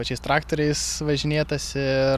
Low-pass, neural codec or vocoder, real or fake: 14.4 kHz; none; real